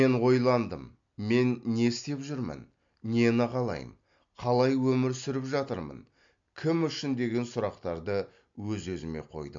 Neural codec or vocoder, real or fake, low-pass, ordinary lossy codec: none; real; 7.2 kHz; MP3, 64 kbps